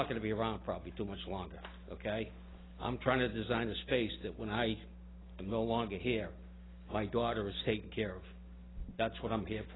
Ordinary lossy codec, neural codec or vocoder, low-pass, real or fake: AAC, 16 kbps; none; 7.2 kHz; real